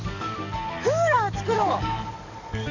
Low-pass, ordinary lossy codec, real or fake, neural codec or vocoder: 7.2 kHz; none; fake; codec, 44.1 kHz, 7.8 kbps, DAC